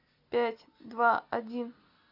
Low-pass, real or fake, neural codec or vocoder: 5.4 kHz; real; none